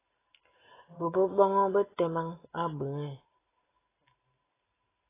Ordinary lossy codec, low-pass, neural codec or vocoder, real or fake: AAC, 16 kbps; 3.6 kHz; none; real